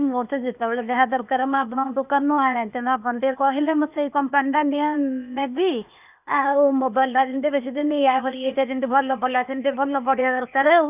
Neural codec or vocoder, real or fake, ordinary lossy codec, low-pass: codec, 16 kHz, 0.8 kbps, ZipCodec; fake; none; 3.6 kHz